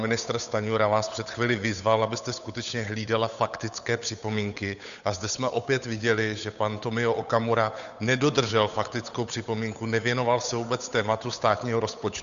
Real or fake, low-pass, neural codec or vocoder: fake; 7.2 kHz; codec, 16 kHz, 8 kbps, FunCodec, trained on Chinese and English, 25 frames a second